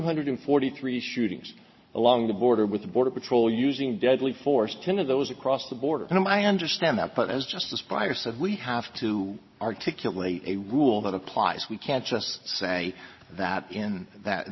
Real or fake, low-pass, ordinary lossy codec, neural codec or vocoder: real; 7.2 kHz; MP3, 24 kbps; none